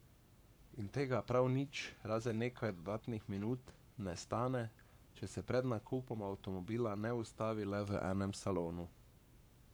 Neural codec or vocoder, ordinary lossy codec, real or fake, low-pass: codec, 44.1 kHz, 7.8 kbps, Pupu-Codec; none; fake; none